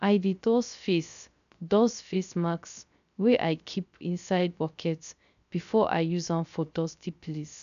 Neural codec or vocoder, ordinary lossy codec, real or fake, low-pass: codec, 16 kHz, 0.3 kbps, FocalCodec; none; fake; 7.2 kHz